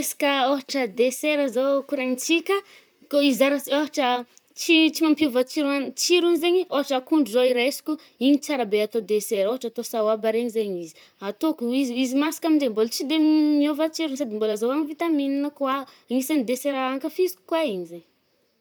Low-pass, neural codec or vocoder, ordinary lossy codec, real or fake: none; vocoder, 44.1 kHz, 128 mel bands, Pupu-Vocoder; none; fake